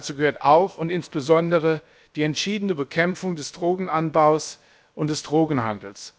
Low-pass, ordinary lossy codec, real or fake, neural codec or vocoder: none; none; fake; codec, 16 kHz, about 1 kbps, DyCAST, with the encoder's durations